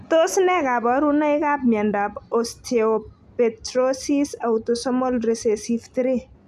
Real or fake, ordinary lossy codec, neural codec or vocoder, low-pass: real; none; none; 14.4 kHz